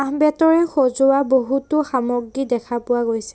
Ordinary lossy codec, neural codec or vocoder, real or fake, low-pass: none; none; real; none